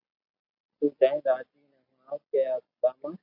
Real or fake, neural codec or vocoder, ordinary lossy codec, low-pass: real; none; AAC, 32 kbps; 5.4 kHz